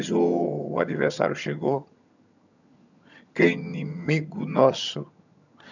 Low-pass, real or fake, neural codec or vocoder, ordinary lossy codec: 7.2 kHz; fake; vocoder, 22.05 kHz, 80 mel bands, HiFi-GAN; none